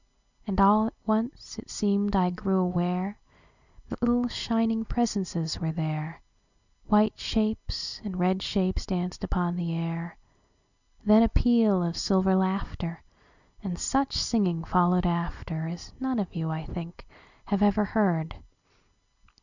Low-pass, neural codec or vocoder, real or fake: 7.2 kHz; none; real